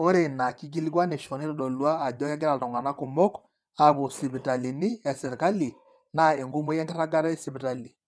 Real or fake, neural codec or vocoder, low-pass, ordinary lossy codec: fake; vocoder, 22.05 kHz, 80 mel bands, WaveNeXt; none; none